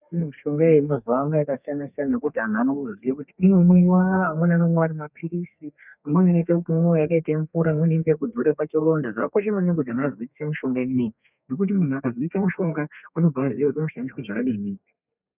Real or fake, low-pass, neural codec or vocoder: fake; 3.6 kHz; codec, 32 kHz, 1.9 kbps, SNAC